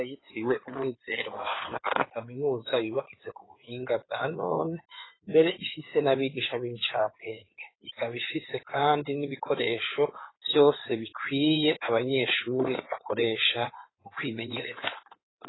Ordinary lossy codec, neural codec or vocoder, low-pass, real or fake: AAC, 16 kbps; codec, 16 kHz in and 24 kHz out, 2.2 kbps, FireRedTTS-2 codec; 7.2 kHz; fake